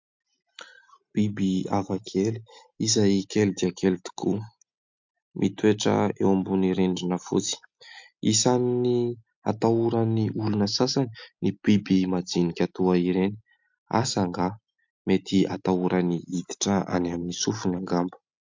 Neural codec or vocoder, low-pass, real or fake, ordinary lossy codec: none; 7.2 kHz; real; MP3, 64 kbps